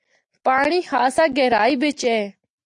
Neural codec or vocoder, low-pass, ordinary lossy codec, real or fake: none; 10.8 kHz; AAC, 64 kbps; real